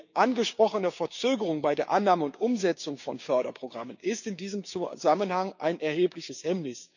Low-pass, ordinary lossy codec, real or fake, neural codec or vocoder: 7.2 kHz; none; fake; codec, 16 kHz, 6 kbps, DAC